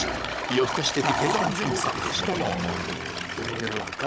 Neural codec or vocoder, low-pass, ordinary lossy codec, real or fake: codec, 16 kHz, 16 kbps, FunCodec, trained on Chinese and English, 50 frames a second; none; none; fake